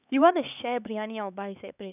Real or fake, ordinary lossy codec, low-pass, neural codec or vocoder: fake; none; 3.6 kHz; codec, 16 kHz, 4 kbps, X-Codec, HuBERT features, trained on LibriSpeech